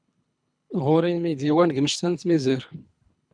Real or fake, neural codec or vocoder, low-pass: fake; codec, 24 kHz, 3 kbps, HILCodec; 9.9 kHz